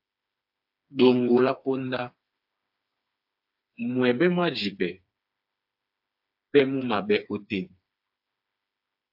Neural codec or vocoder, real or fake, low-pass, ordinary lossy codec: codec, 16 kHz, 4 kbps, FreqCodec, smaller model; fake; 5.4 kHz; AAC, 48 kbps